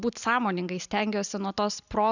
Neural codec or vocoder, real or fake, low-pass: none; real; 7.2 kHz